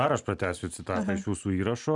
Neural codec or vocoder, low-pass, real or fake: none; 10.8 kHz; real